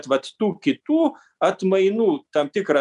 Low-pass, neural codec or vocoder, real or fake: 10.8 kHz; none; real